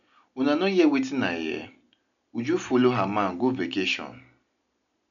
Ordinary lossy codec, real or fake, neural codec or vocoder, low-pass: none; real; none; 7.2 kHz